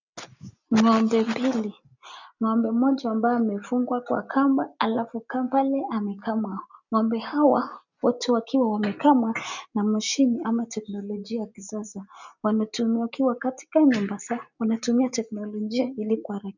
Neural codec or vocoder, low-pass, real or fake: none; 7.2 kHz; real